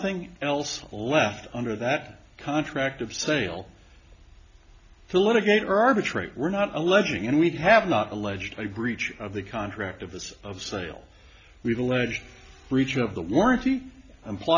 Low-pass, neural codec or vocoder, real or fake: 7.2 kHz; none; real